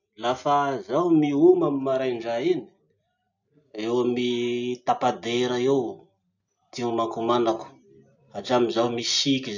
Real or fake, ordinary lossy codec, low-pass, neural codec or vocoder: real; none; 7.2 kHz; none